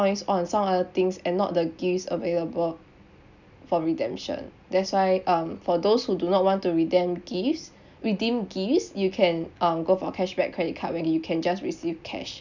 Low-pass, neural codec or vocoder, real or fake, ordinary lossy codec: 7.2 kHz; none; real; none